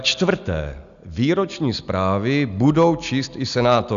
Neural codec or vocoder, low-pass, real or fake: none; 7.2 kHz; real